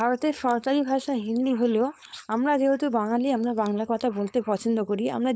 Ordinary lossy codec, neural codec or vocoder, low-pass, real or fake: none; codec, 16 kHz, 4.8 kbps, FACodec; none; fake